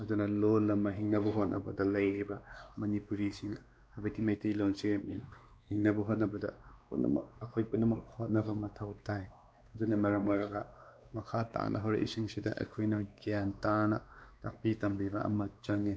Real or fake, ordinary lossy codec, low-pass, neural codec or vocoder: fake; none; none; codec, 16 kHz, 2 kbps, X-Codec, WavLM features, trained on Multilingual LibriSpeech